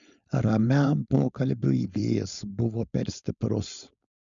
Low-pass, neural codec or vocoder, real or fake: 7.2 kHz; codec, 16 kHz, 4.8 kbps, FACodec; fake